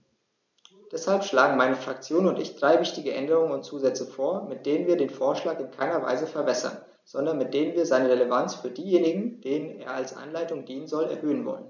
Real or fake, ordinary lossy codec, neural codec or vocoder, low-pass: real; none; none; 7.2 kHz